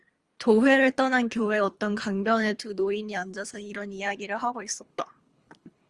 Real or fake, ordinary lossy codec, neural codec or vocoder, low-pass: fake; Opus, 32 kbps; codec, 24 kHz, 3 kbps, HILCodec; 10.8 kHz